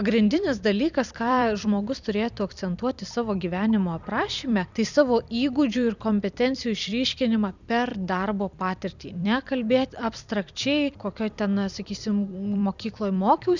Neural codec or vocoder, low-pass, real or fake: vocoder, 44.1 kHz, 128 mel bands every 256 samples, BigVGAN v2; 7.2 kHz; fake